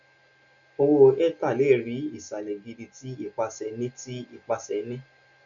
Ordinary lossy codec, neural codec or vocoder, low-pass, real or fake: none; none; 7.2 kHz; real